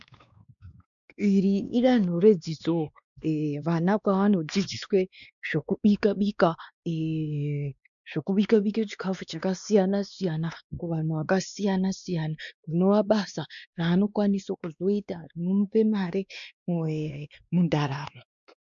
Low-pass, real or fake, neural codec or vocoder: 7.2 kHz; fake; codec, 16 kHz, 2 kbps, X-Codec, WavLM features, trained on Multilingual LibriSpeech